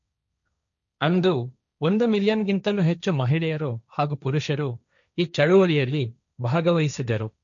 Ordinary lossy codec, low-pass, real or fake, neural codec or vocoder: none; 7.2 kHz; fake; codec, 16 kHz, 1.1 kbps, Voila-Tokenizer